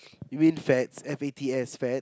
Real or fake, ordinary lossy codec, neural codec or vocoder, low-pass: real; none; none; none